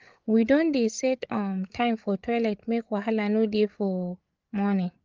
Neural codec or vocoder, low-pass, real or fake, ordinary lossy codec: codec, 16 kHz, 8 kbps, FreqCodec, larger model; 7.2 kHz; fake; Opus, 24 kbps